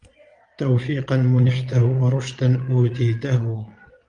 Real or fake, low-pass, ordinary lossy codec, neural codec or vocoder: fake; 9.9 kHz; Opus, 32 kbps; vocoder, 22.05 kHz, 80 mel bands, Vocos